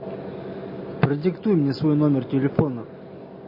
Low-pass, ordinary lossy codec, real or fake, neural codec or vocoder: 5.4 kHz; MP3, 24 kbps; real; none